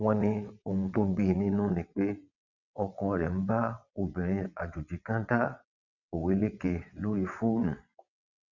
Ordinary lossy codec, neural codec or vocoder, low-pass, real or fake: none; vocoder, 22.05 kHz, 80 mel bands, WaveNeXt; 7.2 kHz; fake